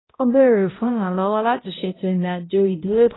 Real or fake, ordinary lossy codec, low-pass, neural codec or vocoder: fake; AAC, 16 kbps; 7.2 kHz; codec, 16 kHz, 0.5 kbps, X-Codec, HuBERT features, trained on balanced general audio